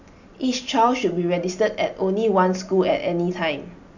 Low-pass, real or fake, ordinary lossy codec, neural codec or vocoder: 7.2 kHz; real; none; none